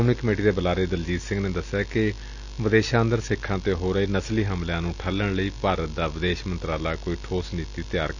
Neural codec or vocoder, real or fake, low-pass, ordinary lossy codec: none; real; 7.2 kHz; none